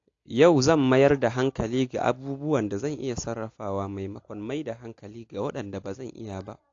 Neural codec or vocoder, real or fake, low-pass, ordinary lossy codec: none; real; 7.2 kHz; AAC, 48 kbps